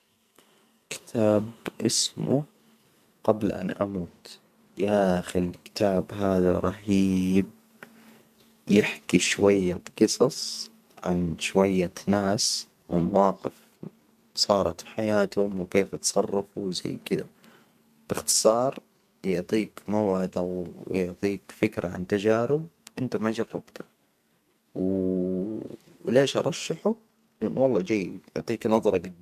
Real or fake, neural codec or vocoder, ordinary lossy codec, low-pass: fake; codec, 44.1 kHz, 2.6 kbps, SNAC; MP3, 96 kbps; 14.4 kHz